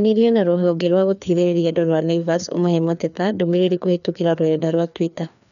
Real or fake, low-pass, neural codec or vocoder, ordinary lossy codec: fake; 7.2 kHz; codec, 16 kHz, 2 kbps, FreqCodec, larger model; none